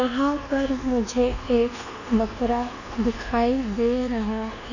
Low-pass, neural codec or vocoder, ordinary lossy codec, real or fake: 7.2 kHz; codec, 24 kHz, 1.2 kbps, DualCodec; none; fake